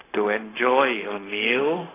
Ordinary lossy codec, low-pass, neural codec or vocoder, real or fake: AAC, 16 kbps; 3.6 kHz; codec, 16 kHz, 0.4 kbps, LongCat-Audio-Codec; fake